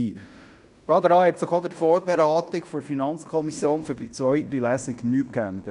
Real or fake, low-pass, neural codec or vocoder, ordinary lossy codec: fake; 10.8 kHz; codec, 16 kHz in and 24 kHz out, 0.9 kbps, LongCat-Audio-Codec, fine tuned four codebook decoder; none